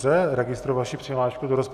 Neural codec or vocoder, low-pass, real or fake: none; 14.4 kHz; real